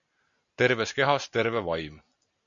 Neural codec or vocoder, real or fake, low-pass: none; real; 7.2 kHz